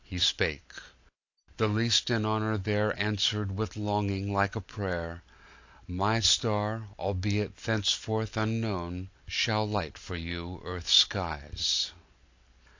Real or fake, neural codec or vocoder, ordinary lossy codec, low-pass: real; none; AAC, 48 kbps; 7.2 kHz